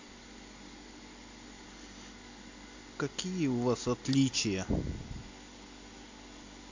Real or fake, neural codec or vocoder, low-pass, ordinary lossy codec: real; none; 7.2 kHz; AAC, 48 kbps